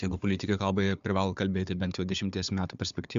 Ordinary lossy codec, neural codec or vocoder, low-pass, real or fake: MP3, 64 kbps; codec, 16 kHz, 4 kbps, FunCodec, trained on Chinese and English, 50 frames a second; 7.2 kHz; fake